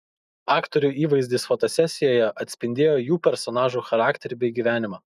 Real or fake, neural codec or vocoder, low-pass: real; none; 14.4 kHz